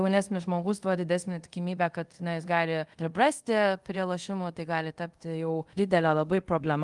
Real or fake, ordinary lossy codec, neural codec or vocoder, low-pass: fake; Opus, 24 kbps; codec, 24 kHz, 0.5 kbps, DualCodec; 10.8 kHz